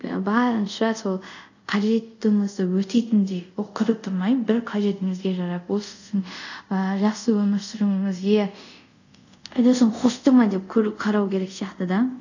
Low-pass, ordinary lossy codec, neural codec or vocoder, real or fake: 7.2 kHz; none; codec, 24 kHz, 0.5 kbps, DualCodec; fake